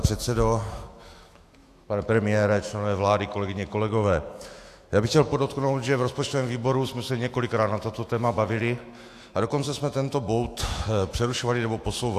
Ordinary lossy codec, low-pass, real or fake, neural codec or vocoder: AAC, 64 kbps; 14.4 kHz; fake; autoencoder, 48 kHz, 128 numbers a frame, DAC-VAE, trained on Japanese speech